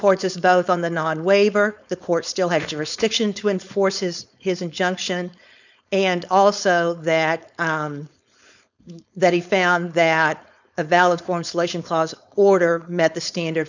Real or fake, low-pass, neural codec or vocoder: fake; 7.2 kHz; codec, 16 kHz, 4.8 kbps, FACodec